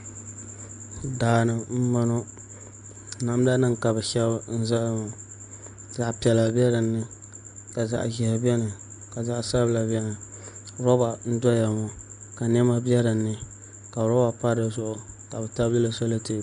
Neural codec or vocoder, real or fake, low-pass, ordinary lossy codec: none; real; 9.9 kHz; AAC, 96 kbps